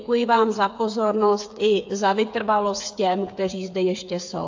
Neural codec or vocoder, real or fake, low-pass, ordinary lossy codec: codec, 16 kHz, 8 kbps, FreqCodec, smaller model; fake; 7.2 kHz; AAC, 48 kbps